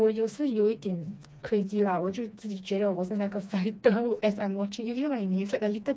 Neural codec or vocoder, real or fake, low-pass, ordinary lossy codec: codec, 16 kHz, 2 kbps, FreqCodec, smaller model; fake; none; none